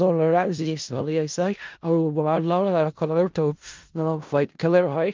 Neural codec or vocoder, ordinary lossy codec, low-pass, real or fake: codec, 16 kHz in and 24 kHz out, 0.4 kbps, LongCat-Audio-Codec, four codebook decoder; Opus, 24 kbps; 7.2 kHz; fake